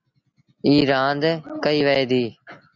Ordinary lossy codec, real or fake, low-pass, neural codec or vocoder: MP3, 64 kbps; real; 7.2 kHz; none